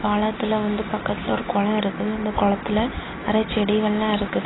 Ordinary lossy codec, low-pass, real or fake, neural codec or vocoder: AAC, 16 kbps; 7.2 kHz; real; none